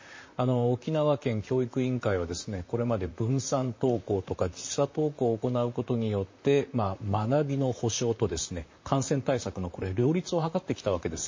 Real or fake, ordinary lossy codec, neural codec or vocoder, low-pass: fake; MP3, 32 kbps; vocoder, 44.1 kHz, 128 mel bands, Pupu-Vocoder; 7.2 kHz